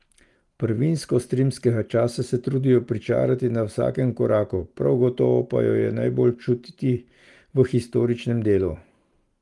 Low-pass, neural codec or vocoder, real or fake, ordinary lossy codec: 10.8 kHz; none; real; Opus, 24 kbps